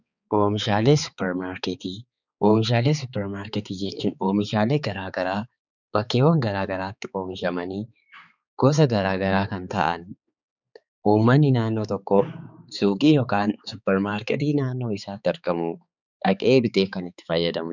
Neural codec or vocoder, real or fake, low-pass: codec, 16 kHz, 4 kbps, X-Codec, HuBERT features, trained on balanced general audio; fake; 7.2 kHz